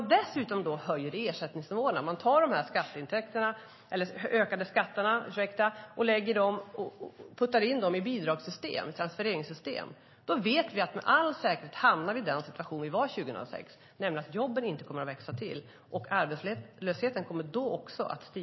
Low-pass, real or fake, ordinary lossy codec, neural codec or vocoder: 7.2 kHz; real; MP3, 24 kbps; none